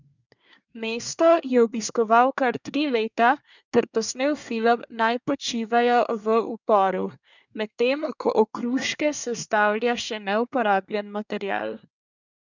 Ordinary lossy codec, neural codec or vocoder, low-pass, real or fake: none; codec, 24 kHz, 1 kbps, SNAC; 7.2 kHz; fake